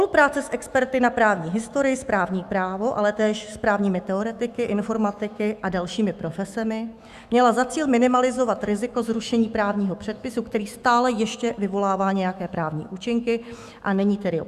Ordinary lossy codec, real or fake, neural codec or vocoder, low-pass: Opus, 64 kbps; fake; autoencoder, 48 kHz, 128 numbers a frame, DAC-VAE, trained on Japanese speech; 14.4 kHz